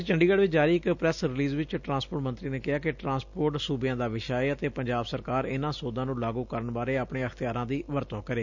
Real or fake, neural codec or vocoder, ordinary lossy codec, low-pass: real; none; none; 7.2 kHz